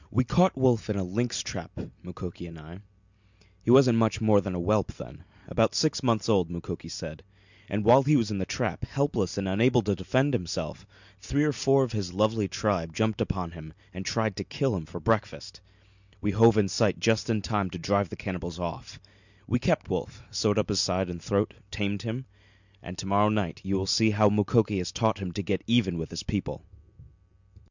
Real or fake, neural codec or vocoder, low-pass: real; none; 7.2 kHz